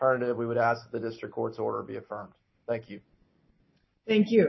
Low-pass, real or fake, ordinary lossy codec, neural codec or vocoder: 7.2 kHz; real; MP3, 24 kbps; none